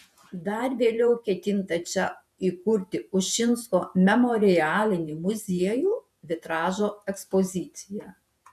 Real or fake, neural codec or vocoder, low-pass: fake; vocoder, 48 kHz, 128 mel bands, Vocos; 14.4 kHz